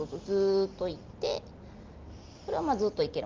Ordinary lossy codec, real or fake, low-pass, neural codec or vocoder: Opus, 32 kbps; real; 7.2 kHz; none